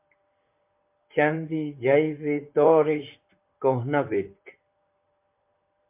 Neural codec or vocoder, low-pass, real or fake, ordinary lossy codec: vocoder, 44.1 kHz, 128 mel bands, Pupu-Vocoder; 3.6 kHz; fake; MP3, 24 kbps